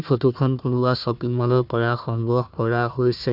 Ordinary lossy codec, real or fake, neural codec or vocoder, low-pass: none; fake; codec, 16 kHz, 1 kbps, FunCodec, trained on Chinese and English, 50 frames a second; 5.4 kHz